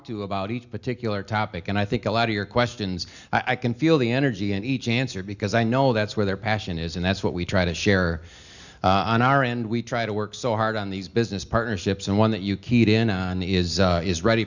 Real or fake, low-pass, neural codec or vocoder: real; 7.2 kHz; none